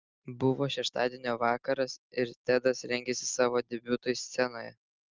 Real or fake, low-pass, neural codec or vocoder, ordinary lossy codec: real; 7.2 kHz; none; Opus, 32 kbps